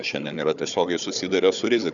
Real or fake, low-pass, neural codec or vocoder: fake; 7.2 kHz; codec, 16 kHz, 4 kbps, FunCodec, trained on Chinese and English, 50 frames a second